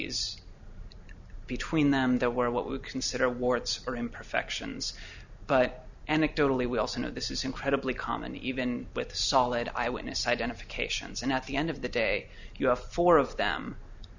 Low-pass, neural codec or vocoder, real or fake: 7.2 kHz; none; real